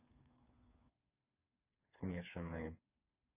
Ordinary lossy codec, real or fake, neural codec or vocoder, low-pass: none; fake; codec, 16 kHz, 4 kbps, FreqCodec, smaller model; 3.6 kHz